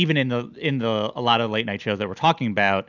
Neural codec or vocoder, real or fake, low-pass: none; real; 7.2 kHz